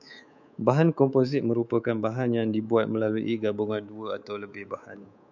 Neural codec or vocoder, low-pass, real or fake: codec, 24 kHz, 3.1 kbps, DualCodec; 7.2 kHz; fake